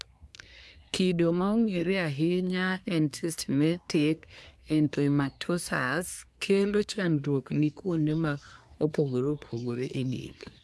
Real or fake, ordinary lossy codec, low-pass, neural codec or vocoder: fake; none; none; codec, 24 kHz, 1 kbps, SNAC